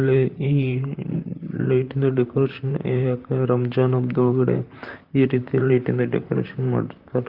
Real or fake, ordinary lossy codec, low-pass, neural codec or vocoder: fake; Opus, 32 kbps; 5.4 kHz; vocoder, 44.1 kHz, 128 mel bands, Pupu-Vocoder